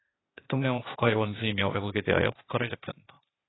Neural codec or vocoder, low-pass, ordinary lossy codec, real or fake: codec, 16 kHz, 0.8 kbps, ZipCodec; 7.2 kHz; AAC, 16 kbps; fake